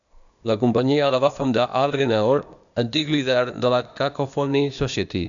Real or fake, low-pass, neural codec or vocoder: fake; 7.2 kHz; codec, 16 kHz, 0.8 kbps, ZipCodec